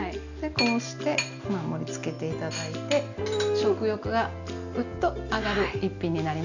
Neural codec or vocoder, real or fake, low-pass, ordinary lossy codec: none; real; 7.2 kHz; none